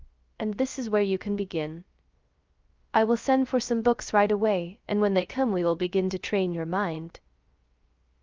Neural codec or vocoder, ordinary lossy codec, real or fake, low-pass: codec, 16 kHz, 0.3 kbps, FocalCodec; Opus, 24 kbps; fake; 7.2 kHz